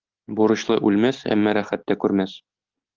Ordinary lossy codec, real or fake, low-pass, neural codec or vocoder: Opus, 32 kbps; real; 7.2 kHz; none